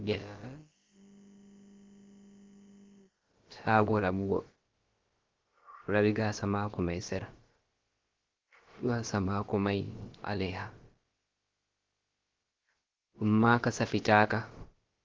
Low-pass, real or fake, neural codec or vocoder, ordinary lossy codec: 7.2 kHz; fake; codec, 16 kHz, about 1 kbps, DyCAST, with the encoder's durations; Opus, 16 kbps